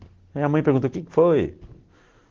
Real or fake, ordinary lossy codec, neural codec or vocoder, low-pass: real; Opus, 16 kbps; none; 7.2 kHz